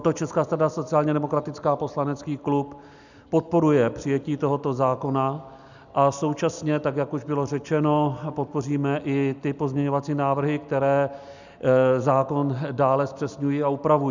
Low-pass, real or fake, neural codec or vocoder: 7.2 kHz; real; none